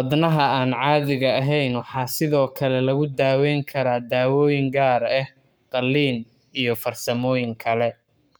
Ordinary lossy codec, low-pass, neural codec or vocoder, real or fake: none; none; codec, 44.1 kHz, 7.8 kbps, Pupu-Codec; fake